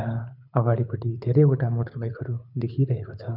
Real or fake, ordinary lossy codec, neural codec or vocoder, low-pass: fake; none; codec, 24 kHz, 6 kbps, HILCodec; 5.4 kHz